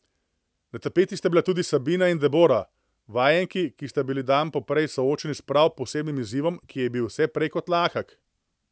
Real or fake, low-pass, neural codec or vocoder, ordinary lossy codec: real; none; none; none